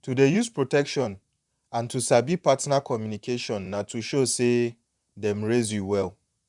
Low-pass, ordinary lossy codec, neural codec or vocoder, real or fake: 10.8 kHz; none; vocoder, 44.1 kHz, 128 mel bands, Pupu-Vocoder; fake